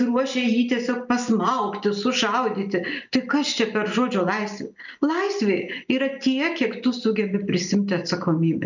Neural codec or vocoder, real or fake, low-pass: none; real; 7.2 kHz